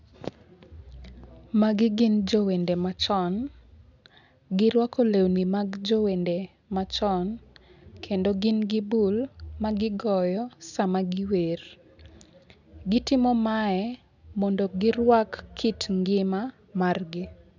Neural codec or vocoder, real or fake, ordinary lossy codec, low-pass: none; real; none; 7.2 kHz